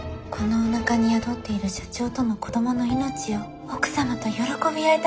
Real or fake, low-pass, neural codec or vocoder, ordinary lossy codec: real; none; none; none